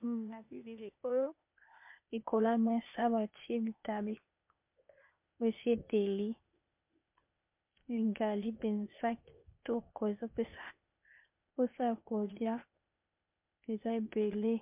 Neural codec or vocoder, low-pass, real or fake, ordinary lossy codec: codec, 16 kHz, 0.8 kbps, ZipCodec; 3.6 kHz; fake; MP3, 32 kbps